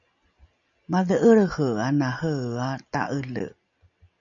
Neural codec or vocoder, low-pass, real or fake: none; 7.2 kHz; real